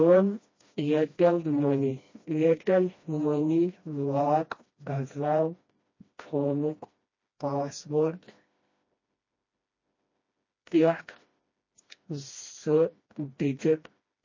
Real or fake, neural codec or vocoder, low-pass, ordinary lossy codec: fake; codec, 16 kHz, 1 kbps, FreqCodec, smaller model; 7.2 kHz; MP3, 32 kbps